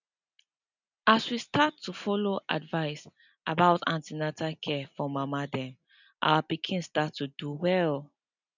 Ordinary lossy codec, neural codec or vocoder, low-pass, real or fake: none; none; 7.2 kHz; real